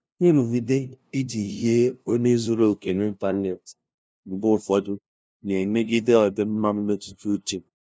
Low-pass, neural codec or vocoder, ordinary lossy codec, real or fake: none; codec, 16 kHz, 0.5 kbps, FunCodec, trained on LibriTTS, 25 frames a second; none; fake